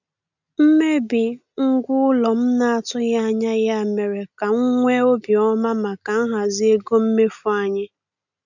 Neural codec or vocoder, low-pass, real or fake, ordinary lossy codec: none; 7.2 kHz; real; none